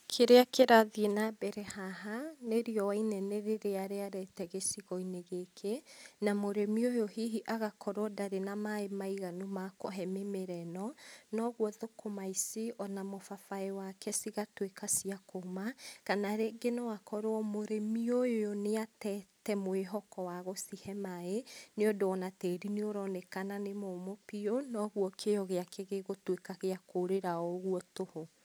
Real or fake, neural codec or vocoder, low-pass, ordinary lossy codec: real; none; none; none